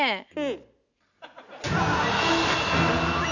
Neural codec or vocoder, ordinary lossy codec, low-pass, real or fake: none; none; 7.2 kHz; real